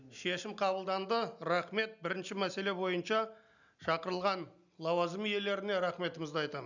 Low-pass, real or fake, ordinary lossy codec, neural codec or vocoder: 7.2 kHz; real; none; none